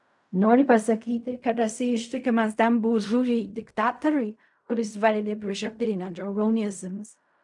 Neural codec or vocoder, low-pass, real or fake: codec, 16 kHz in and 24 kHz out, 0.4 kbps, LongCat-Audio-Codec, fine tuned four codebook decoder; 10.8 kHz; fake